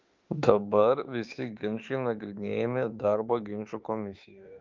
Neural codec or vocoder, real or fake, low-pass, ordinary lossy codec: autoencoder, 48 kHz, 32 numbers a frame, DAC-VAE, trained on Japanese speech; fake; 7.2 kHz; Opus, 32 kbps